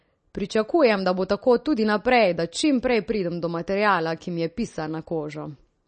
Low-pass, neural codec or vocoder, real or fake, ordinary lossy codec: 10.8 kHz; none; real; MP3, 32 kbps